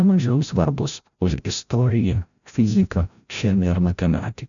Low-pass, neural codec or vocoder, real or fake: 7.2 kHz; codec, 16 kHz, 0.5 kbps, FreqCodec, larger model; fake